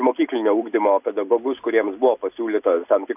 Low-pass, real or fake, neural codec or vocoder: 3.6 kHz; real; none